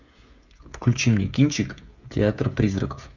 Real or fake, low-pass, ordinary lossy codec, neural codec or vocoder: fake; 7.2 kHz; Opus, 64 kbps; codec, 16 kHz, 16 kbps, FreqCodec, smaller model